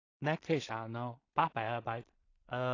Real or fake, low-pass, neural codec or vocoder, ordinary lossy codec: fake; 7.2 kHz; codec, 16 kHz in and 24 kHz out, 0.4 kbps, LongCat-Audio-Codec, two codebook decoder; AAC, 32 kbps